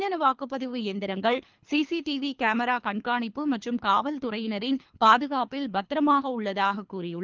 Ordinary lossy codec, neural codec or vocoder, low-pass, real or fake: Opus, 24 kbps; codec, 24 kHz, 3 kbps, HILCodec; 7.2 kHz; fake